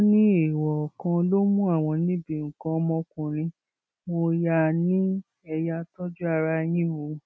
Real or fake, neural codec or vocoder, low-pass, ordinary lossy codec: real; none; none; none